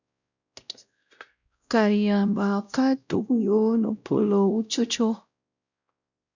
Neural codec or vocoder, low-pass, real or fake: codec, 16 kHz, 0.5 kbps, X-Codec, WavLM features, trained on Multilingual LibriSpeech; 7.2 kHz; fake